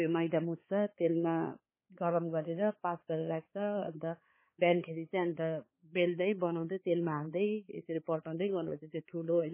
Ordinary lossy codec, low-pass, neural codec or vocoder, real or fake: MP3, 16 kbps; 3.6 kHz; codec, 16 kHz, 4 kbps, X-Codec, HuBERT features, trained on balanced general audio; fake